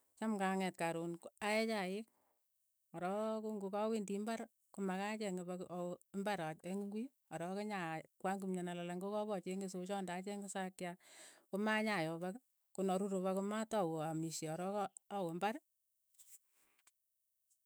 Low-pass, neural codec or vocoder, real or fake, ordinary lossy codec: none; autoencoder, 48 kHz, 128 numbers a frame, DAC-VAE, trained on Japanese speech; fake; none